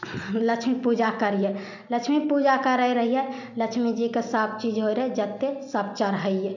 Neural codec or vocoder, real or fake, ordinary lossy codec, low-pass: none; real; none; 7.2 kHz